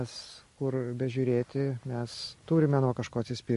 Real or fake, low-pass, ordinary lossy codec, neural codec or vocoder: real; 14.4 kHz; MP3, 48 kbps; none